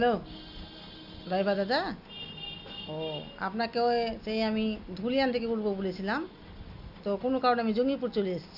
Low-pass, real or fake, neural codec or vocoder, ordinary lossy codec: 5.4 kHz; real; none; Opus, 64 kbps